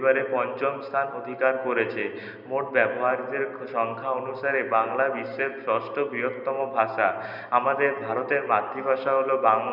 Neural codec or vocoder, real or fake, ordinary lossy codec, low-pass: none; real; none; 5.4 kHz